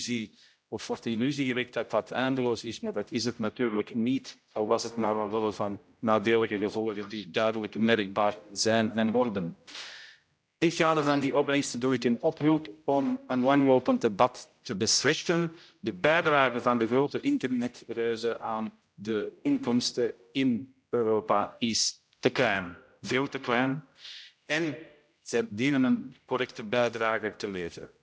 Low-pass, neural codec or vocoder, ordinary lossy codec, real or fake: none; codec, 16 kHz, 0.5 kbps, X-Codec, HuBERT features, trained on general audio; none; fake